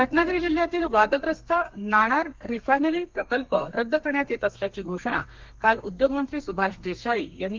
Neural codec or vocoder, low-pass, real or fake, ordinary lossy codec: codec, 32 kHz, 1.9 kbps, SNAC; 7.2 kHz; fake; Opus, 32 kbps